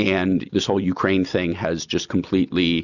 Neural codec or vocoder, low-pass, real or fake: none; 7.2 kHz; real